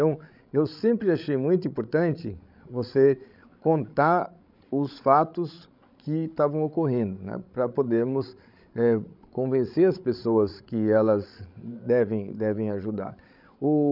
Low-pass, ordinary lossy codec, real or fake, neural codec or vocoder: 5.4 kHz; none; fake; codec, 16 kHz, 16 kbps, FreqCodec, larger model